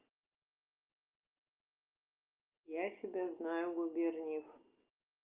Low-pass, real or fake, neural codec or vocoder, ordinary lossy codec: 3.6 kHz; real; none; Opus, 32 kbps